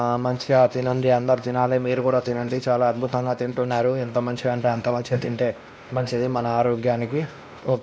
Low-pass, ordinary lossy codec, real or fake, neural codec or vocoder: none; none; fake; codec, 16 kHz, 1 kbps, X-Codec, WavLM features, trained on Multilingual LibriSpeech